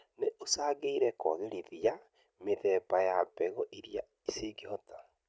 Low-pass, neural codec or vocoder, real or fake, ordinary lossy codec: none; none; real; none